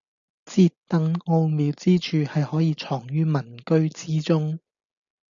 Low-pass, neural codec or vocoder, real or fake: 7.2 kHz; none; real